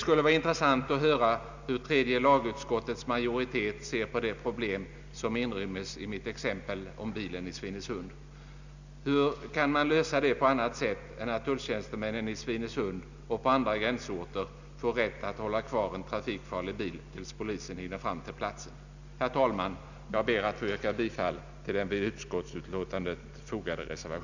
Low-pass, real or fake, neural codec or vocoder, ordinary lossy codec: 7.2 kHz; real; none; none